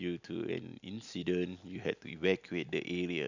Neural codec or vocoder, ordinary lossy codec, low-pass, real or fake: none; none; 7.2 kHz; real